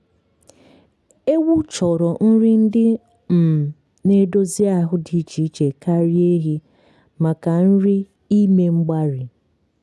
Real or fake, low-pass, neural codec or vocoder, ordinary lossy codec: real; none; none; none